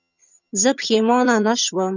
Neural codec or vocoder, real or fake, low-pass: vocoder, 22.05 kHz, 80 mel bands, HiFi-GAN; fake; 7.2 kHz